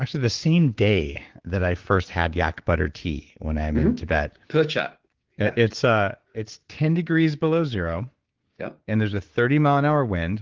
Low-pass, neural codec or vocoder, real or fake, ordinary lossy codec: 7.2 kHz; codec, 16 kHz, 6 kbps, DAC; fake; Opus, 16 kbps